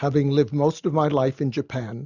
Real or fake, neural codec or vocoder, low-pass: real; none; 7.2 kHz